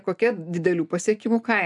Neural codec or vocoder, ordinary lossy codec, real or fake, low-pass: none; MP3, 96 kbps; real; 10.8 kHz